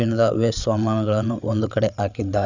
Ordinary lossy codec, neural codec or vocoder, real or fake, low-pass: none; codec, 16 kHz, 8 kbps, FreqCodec, larger model; fake; 7.2 kHz